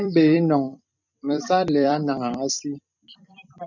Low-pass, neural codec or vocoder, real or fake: 7.2 kHz; vocoder, 44.1 kHz, 128 mel bands every 256 samples, BigVGAN v2; fake